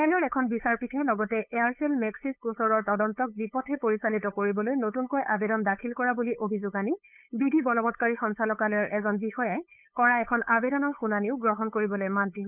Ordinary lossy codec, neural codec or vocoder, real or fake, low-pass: none; codec, 16 kHz, 8 kbps, FunCodec, trained on LibriTTS, 25 frames a second; fake; 3.6 kHz